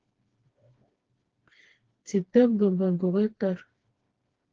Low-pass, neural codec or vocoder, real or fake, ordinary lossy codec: 7.2 kHz; codec, 16 kHz, 2 kbps, FreqCodec, smaller model; fake; Opus, 16 kbps